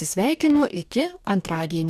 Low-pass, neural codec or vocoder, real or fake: 14.4 kHz; codec, 44.1 kHz, 2.6 kbps, DAC; fake